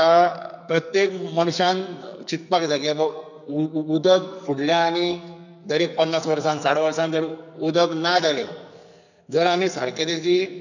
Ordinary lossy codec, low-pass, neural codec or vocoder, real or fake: none; 7.2 kHz; codec, 44.1 kHz, 2.6 kbps, SNAC; fake